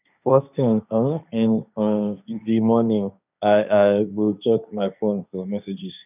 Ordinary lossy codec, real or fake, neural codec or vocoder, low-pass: none; fake; codec, 16 kHz, 1.1 kbps, Voila-Tokenizer; 3.6 kHz